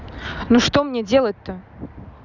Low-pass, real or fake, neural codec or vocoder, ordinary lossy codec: 7.2 kHz; real; none; none